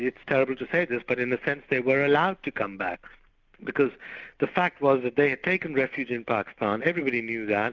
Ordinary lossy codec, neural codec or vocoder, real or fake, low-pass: Opus, 64 kbps; none; real; 7.2 kHz